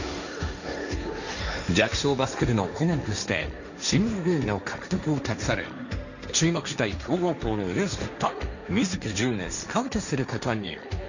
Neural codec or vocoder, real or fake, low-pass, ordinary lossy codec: codec, 16 kHz, 1.1 kbps, Voila-Tokenizer; fake; 7.2 kHz; none